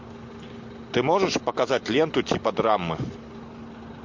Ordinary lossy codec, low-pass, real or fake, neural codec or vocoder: MP3, 48 kbps; 7.2 kHz; real; none